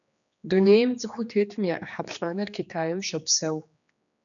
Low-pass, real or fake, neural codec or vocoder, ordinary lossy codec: 7.2 kHz; fake; codec, 16 kHz, 2 kbps, X-Codec, HuBERT features, trained on general audio; MP3, 96 kbps